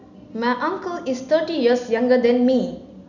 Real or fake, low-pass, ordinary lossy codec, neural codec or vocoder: real; 7.2 kHz; none; none